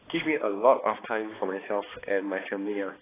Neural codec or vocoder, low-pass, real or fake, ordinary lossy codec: codec, 16 kHz, 2 kbps, X-Codec, HuBERT features, trained on balanced general audio; 3.6 kHz; fake; AAC, 16 kbps